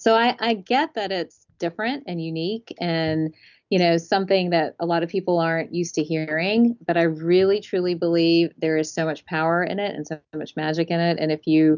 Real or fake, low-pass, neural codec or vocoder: real; 7.2 kHz; none